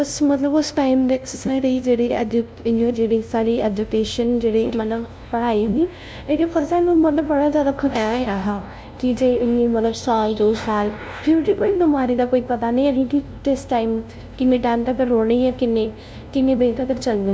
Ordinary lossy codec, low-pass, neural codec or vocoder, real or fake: none; none; codec, 16 kHz, 0.5 kbps, FunCodec, trained on LibriTTS, 25 frames a second; fake